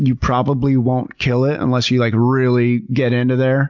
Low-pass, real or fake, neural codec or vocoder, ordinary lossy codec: 7.2 kHz; real; none; MP3, 64 kbps